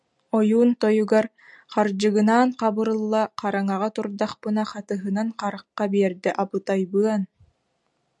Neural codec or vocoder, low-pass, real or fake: none; 10.8 kHz; real